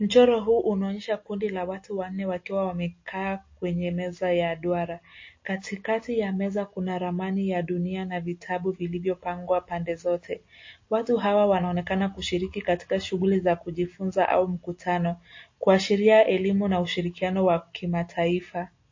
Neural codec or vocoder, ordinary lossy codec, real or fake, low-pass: none; MP3, 32 kbps; real; 7.2 kHz